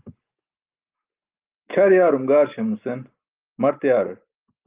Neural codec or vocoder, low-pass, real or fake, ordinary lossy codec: none; 3.6 kHz; real; Opus, 24 kbps